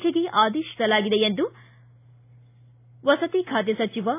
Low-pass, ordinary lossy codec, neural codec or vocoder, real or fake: 3.6 kHz; AAC, 24 kbps; none; real